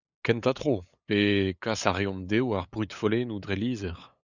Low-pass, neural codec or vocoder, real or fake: 7.2 kHz; codec, 16 kHz, 8 kbps, FunCodec, trained on LibriTTS, 25 frames a second; fake